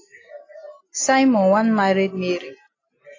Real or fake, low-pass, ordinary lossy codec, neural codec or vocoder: real; 7.2 kHz; AAC, 32 kbps; none